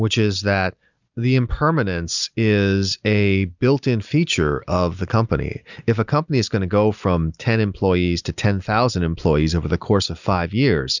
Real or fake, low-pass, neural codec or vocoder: real; 7.2 kHz; none